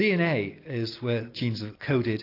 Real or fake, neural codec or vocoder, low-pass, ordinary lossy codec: real; none; 5.4 kHz; AAC, 32 kbps